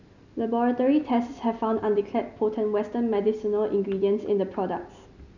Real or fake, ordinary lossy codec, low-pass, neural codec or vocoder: real; none; 7.2 kHz; none